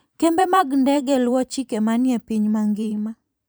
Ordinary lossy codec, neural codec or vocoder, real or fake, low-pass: none; vocoder, 44.1 kHz, 128 mel bands every 512 samples, BigVGAN v2; fake; none